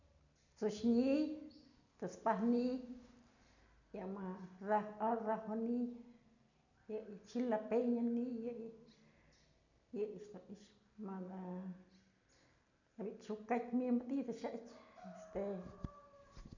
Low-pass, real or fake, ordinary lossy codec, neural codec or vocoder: 7.2 kHz; real; none; none